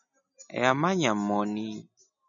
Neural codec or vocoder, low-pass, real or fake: none; 7.2 kHz; real